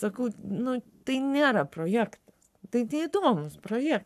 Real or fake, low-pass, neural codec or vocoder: fake; 14.4 kHz; codec, 44.1 kHz, 7.8 kbps, Pupu-Codec